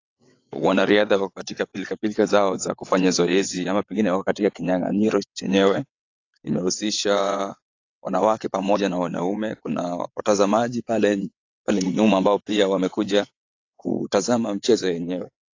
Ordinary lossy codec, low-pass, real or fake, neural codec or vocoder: AAC, 48 kbps; 7.2 kHz; fake; vocoder, 22.05 kHz, 80 mel bands, WaveNeXt